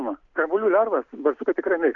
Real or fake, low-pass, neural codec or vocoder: real; 7.2 kHz; none